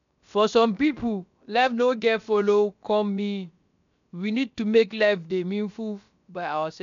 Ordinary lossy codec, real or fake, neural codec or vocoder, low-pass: MP3, 96 kbps; fake; codec, 16 kHz, about 1 kbps, DyCAST, with the encoder's durations; 7.2 kHz